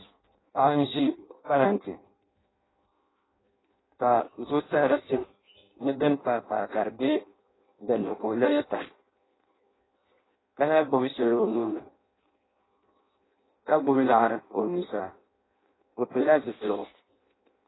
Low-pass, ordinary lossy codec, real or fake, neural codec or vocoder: 7.2 kHz; AAC, 16 kbps; fake; codec, 16 kHz in and 24 kHz out, 0.6 kbps, FireRedTTS-2 codec